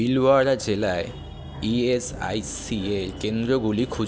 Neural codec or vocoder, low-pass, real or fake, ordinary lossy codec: none; none; real; none